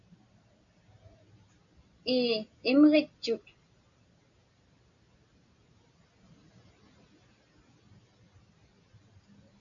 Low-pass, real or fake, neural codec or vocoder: 7.2 kHz; real; none